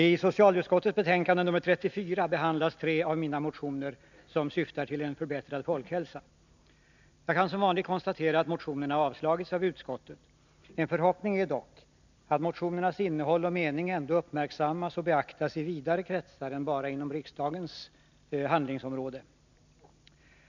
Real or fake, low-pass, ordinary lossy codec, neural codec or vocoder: real; 7.2 kHz; none; none